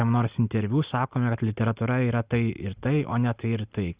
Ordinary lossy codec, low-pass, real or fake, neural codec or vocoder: Opus, 64 kbps; 3.6 kHz; real; none